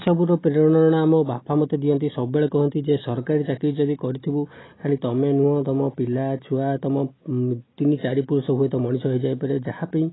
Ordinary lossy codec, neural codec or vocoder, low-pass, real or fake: AAC, 16 kbps; none; 7.2 kHz; real